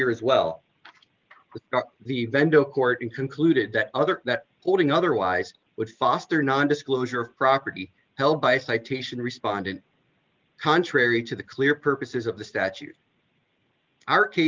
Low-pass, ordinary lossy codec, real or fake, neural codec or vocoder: 7.2 kHz; Opus, 32 kbps; real; none